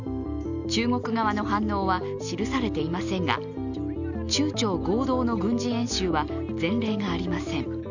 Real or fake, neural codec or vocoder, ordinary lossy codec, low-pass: real; none; none; 7.2 kHz